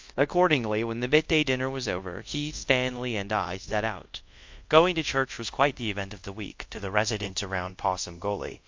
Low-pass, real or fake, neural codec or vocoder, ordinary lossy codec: 7.2 kHz; fake; codec, 24 kHz, 0.5 kbps, DualCodec; MP3, 48 kbps